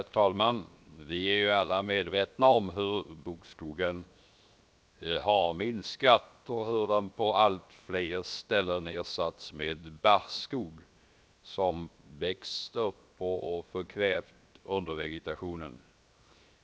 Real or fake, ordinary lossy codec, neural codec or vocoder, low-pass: fake; none; codec, 16 kHz, 0.7 kbps, FocalCodec; none